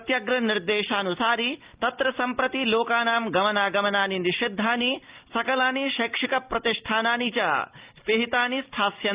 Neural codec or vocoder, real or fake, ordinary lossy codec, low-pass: none; real; Opus, 32 kbps; 3.6 kHz